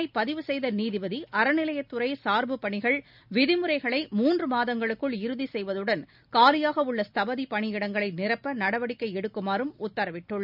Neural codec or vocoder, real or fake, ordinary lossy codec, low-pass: none; real; none; 5.4 kHz